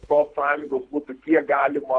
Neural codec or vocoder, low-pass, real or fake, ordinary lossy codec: codec, 24 kHz, 3 kbps, HILCodec; 9.9 kHz; fake; AAC, 48 kbps